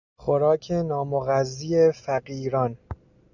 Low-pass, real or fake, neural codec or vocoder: 7.2 kHz; real; none